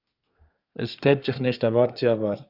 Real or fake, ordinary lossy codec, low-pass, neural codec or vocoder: fake; Opus, 64 kbps; 5.4 kHz; codec, 24 kHz, 1 kbps, SNAC